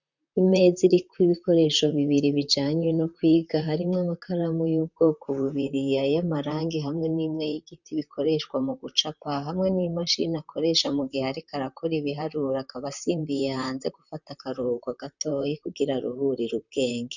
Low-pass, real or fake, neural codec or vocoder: 7.2 kHz; fake; vocoder, 44.1 kHz, 128 mel bands, Pupu-Vocoder